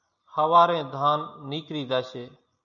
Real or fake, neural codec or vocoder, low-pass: real; none; 7.2 kHz